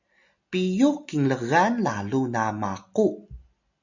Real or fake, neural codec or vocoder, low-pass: real; none; 7.2 kHz